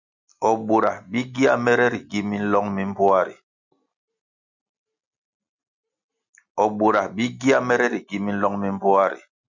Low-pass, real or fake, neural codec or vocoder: 7.2 kHz; real; none